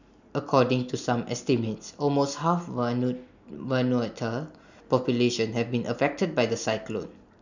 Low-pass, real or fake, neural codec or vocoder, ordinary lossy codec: 7.2 kHz; real; none; none